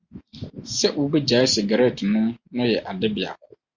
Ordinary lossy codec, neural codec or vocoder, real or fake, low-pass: Opus, 64 kbps; none; real; 7.2 kHz